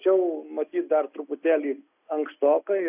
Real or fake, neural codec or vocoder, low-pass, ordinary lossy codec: real; none; 3.6 kHz; AAC, 32 kbps